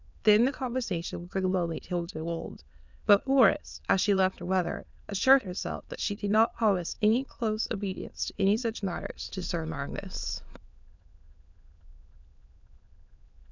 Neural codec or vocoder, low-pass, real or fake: autoencoder, 22.05 kHz, a latent of 192 numbers a frame, VITS, trained on many speakers; 7.2 kHz; fake